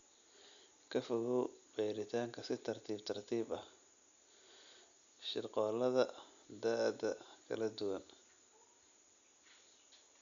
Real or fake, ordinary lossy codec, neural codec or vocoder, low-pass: real; none; none; 7.2 kHz